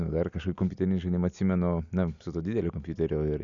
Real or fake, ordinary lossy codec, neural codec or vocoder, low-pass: real; MP3, 96 kbps; none; 7.2 kHz